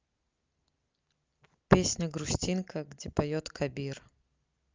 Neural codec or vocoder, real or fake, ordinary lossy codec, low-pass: none; real; Opus, 24 kbps; 7.2 kHz